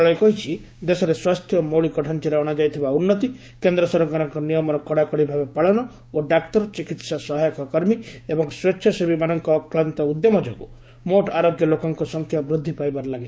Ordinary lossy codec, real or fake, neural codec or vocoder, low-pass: none; fake; codec, 16 kHz, 6 kbps, DAC; none